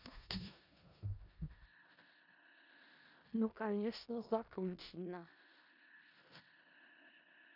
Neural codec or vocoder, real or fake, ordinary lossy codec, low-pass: codec, 16 kHz in and 24 kHz out, 0.4 kbps, LongCat-Audio-Codec, four codebook decoder; fake; none; 5.4 kHz